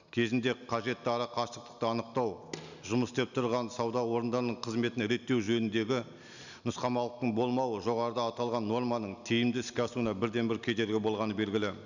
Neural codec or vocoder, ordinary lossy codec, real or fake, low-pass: none; none; real; 7.2 kHz